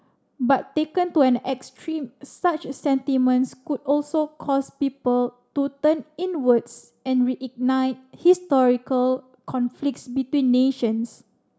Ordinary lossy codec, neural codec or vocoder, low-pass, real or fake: none; none; none; real